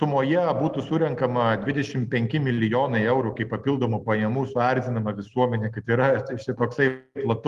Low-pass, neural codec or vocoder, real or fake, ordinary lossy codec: 14.4 kHz; none; real; Opus, 24 kbps